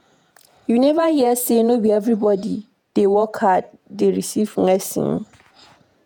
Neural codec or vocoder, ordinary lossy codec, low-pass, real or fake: vocoder, 48 kHz, 128 mel bands, Vocos; none; none; fake